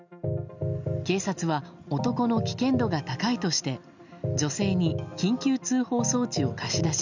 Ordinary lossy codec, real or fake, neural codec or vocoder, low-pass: none; real; none; 7.2 kHz